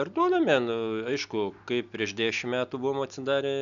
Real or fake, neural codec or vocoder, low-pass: real; none; 7.2 kHz